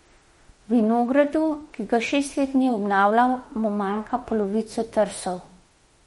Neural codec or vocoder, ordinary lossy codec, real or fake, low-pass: autoencoder, 48 kHz, 32 numbers a frame, DAC-VAE, trained on Japanese speech; MP3, 48 kbps; fake; 19.8 kHz